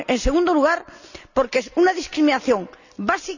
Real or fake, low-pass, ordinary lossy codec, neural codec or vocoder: real; 7.2 kHz; none; none